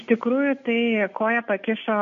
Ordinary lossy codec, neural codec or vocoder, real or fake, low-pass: MP3, 48 kbps; none; real; 7.2 kHz